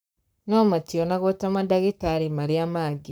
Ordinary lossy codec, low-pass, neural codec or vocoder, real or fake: none; none; codec, 44.1 kHz, 7.8 kbps, Pupu-Codec; fake